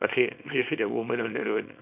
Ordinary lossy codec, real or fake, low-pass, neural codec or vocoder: none; fake; 3.6 kHz; codec, 24 kHz, 0.9 kbps, WavTokenizer, small release